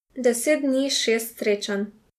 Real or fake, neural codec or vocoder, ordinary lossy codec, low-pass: real; none; MP3, 96 kbps; 14.4 kHz